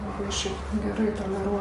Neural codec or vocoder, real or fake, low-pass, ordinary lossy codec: none; real; 14.4 kHz; MP3, 48 kbps